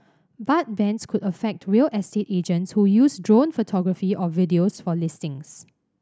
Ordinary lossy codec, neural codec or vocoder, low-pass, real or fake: none; none; none; real